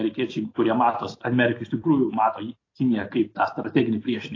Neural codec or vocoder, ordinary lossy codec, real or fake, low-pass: none; AAC, 32 kbps; real; 7.2 kHz